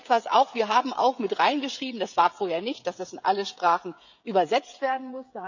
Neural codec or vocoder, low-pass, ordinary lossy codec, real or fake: codec, 16 kHz, 16 kbps, FreqCodec, smaller model; 7.2 kHz; none; fake